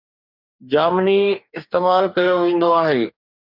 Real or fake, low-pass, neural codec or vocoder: fake; 5.4 kHz; codec, 44.1 kHz, 2.6 kbps, DAC